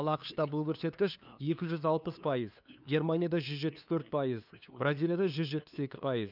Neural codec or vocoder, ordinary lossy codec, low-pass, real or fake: codec, 16 kHz, 2 kbps, FunCodec, trained on LibriTTS, 25 frames a second; none; 5.4 kHz; fake